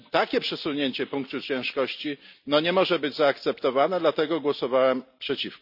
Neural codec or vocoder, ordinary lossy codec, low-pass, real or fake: none; none; 5.4 kHz; real